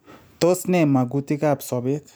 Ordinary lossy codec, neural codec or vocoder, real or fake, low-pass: none; none; real; none